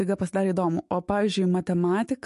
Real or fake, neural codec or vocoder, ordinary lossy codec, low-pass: fake; autoencoder, 48 kHz, 128 numbers a frame, DAC-VAE, trained on Japanese speech; MP3, 48 kbps; 14.4 kHz